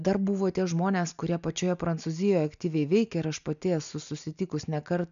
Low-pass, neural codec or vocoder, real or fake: 7.2 kHz; none; real